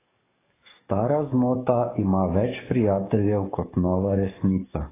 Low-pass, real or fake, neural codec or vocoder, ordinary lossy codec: 3.6 kHz; fake; codec, 44.1 kHz, 7.8 kbps, DAC; AAC, 16 kbps